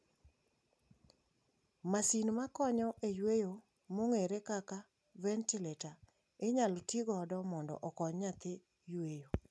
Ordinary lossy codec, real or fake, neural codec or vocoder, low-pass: none; real; none; none